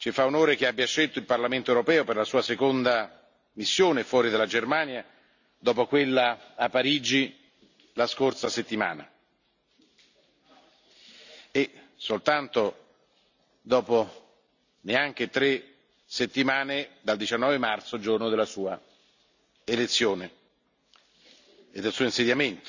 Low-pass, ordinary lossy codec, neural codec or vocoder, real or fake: 7.2 kHz; none; none; real